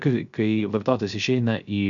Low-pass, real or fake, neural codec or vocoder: 7.2 kHz; fake; codec, 16 kHz, 0.3 kbps, FocalCodec